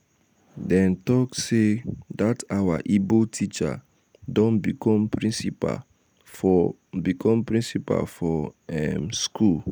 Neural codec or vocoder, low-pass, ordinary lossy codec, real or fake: none; none; none; real